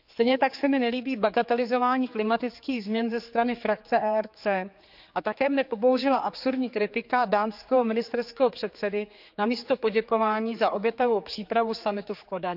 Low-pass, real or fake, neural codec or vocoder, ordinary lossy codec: 5.4 kHz; fake; codec, 16 kHz, 4 kbps, X-Codec, HuBERT features, trained on general audio; none